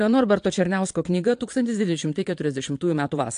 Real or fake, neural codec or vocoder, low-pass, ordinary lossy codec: fake; vocoder, 22.05 kHz, 80 mel bands, Vocos; 9.9 kHz; AAC, 64 kbps